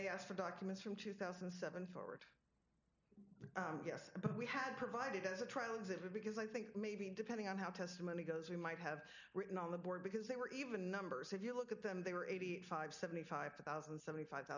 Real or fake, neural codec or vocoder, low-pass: real; none; 7.2 kHz